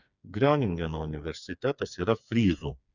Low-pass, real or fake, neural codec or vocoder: 7.2 kHz; fake; codec, 16 kHz, 4 kbps, FreqCodec, smaller model